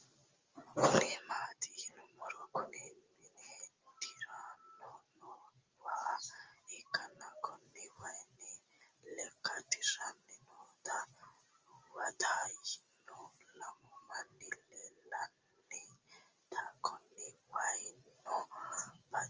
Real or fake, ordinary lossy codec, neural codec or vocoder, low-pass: real; Opus, 32 kbps; none; 7.2 kHz